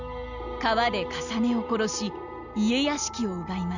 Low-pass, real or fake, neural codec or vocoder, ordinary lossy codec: 7.2 kHz; real; none; none